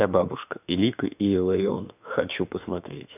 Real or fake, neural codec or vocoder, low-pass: fake; autoencoder, 48 kHz, 32 numbers a frame, DAC-VAE, trained on Japanese speech; 3.6 kHz